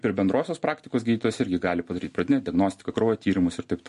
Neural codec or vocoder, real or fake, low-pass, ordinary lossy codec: none; real; 14.4 kHz; MP3, 48 kbps